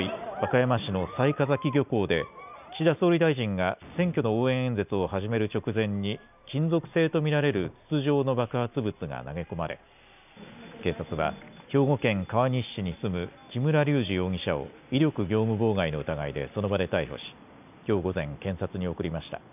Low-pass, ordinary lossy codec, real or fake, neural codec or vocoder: 3.6 kHz; none; real; none